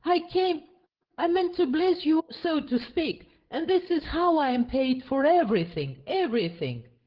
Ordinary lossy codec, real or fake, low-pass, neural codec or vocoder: Opus, 16 kbps; fake; 5.4 kHz; codec, 16 kHz, 8 kbps, FreqCodec, larger model